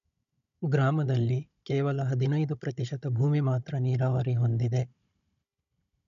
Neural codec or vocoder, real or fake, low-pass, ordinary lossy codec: codec, 16 kHz, 16 kbps, FunCodec, trained on Chinese and English, 50 frames a second; fake; 7.2 kHz; none